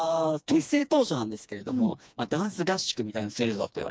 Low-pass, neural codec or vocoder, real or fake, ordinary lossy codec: none; codec, 16 kHz, 2 kbps, FreqCodec, smaller model; fake; none